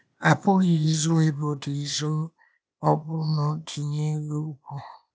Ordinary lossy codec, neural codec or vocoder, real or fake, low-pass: none; codec, 16 kHz, 0.8 kbps, ZipCodec; fake; none